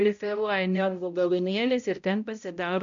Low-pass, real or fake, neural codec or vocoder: 7.2 kHz; fake; codec, 16 kHz, 0.5 kbps, X-Codec, HuBERT features, trained on balanced general audio